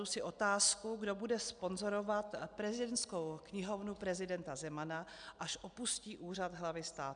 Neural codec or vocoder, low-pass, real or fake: none; 9.9 kHz; real